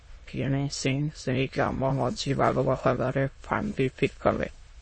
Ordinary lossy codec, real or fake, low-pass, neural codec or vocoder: MP3, 32 kbps; fake; 9.9 kHz; autoencoder, 22.05 kHz, a latent of 192 numbers a frame, VITS, trained on many speakers